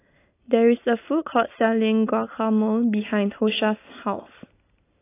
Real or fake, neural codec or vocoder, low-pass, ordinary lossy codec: real; none; 3.6 kHz; AAC, 24 kbps